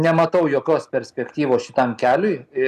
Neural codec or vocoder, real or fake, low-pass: none; real; 14.4 kHz